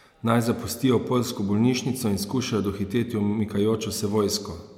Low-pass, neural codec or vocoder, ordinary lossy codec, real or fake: 19.8 kHz; none; MP3, 96 kbps; real